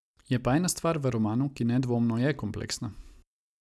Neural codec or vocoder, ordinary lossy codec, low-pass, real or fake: none; none; none; real